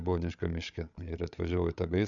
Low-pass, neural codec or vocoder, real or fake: 7.2 kHz; codec, 16 kHz, 8 kbps, FreqCodec, larger model; fake